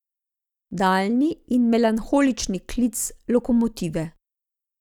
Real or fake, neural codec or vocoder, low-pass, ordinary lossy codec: real; none; 19.8 kHz; none